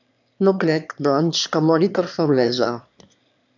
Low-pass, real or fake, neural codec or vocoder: 7.2 kHz; fake; autoencoder, 22.05 kHz, a latent of 192 numbers a frame, VITS, trained on one speaker